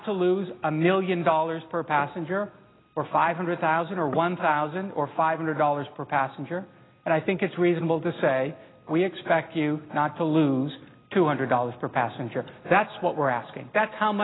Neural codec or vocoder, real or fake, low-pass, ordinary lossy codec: none; real; 7.2 kHz; AAC, 16 kbps